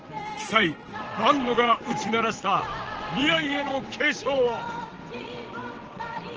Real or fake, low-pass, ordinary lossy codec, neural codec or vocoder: fake; 7.2 kHz; Opus, 16 kbps; vocoder, 22.05 kHz, 80 mel bands, WaveNeXt